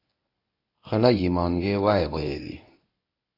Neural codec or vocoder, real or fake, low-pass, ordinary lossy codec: codec, 24 kHz, 0.9 kbps, WavTokenizer, medium speech release version 1; fake; 5.4 kHz; AAC, 32 kbps